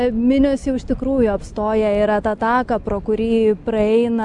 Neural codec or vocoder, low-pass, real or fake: none; 10.8 kHz; real